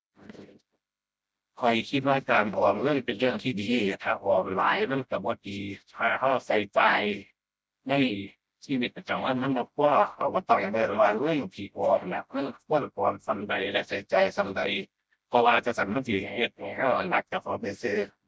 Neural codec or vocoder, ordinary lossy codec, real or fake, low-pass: codec, 16 kHz, 0.5 kbps, FreqCodec, smaller model; none; fake; none